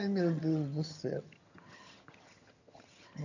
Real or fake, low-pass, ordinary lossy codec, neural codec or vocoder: fake; 7.2 kHz; none; vocoder, 22.05 kHz, 80 mel bands, HiFi-GAN